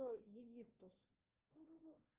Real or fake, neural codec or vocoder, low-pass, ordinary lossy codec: fake; codec, 16 kHz, 1 kbps, FunCodec, trained on Chinese and English, 50 frames a second; 3.6 kHz; Opus, 16 kbps